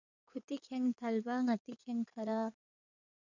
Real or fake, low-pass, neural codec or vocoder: fake; 7.2 kHz; codec, 16 kHz in and 24 kHz out, 2.2 kbps, FireRedTTS-2 codec